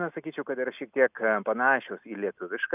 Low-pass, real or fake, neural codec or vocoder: 3.6 kHz; real; none